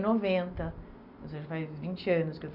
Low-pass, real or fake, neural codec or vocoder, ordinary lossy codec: 5.4 kHz; real; none; none